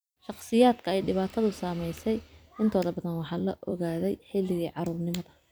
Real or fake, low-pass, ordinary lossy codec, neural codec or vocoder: fake; none; none; vocoder, 44.1 kHz, 128 mel bands every 256 samples, BigVGAN v2